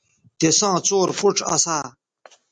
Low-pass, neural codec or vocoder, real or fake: 9.9 kHz; none; real